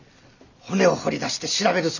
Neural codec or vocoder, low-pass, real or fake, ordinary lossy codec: none; 7.2 kHz; real; none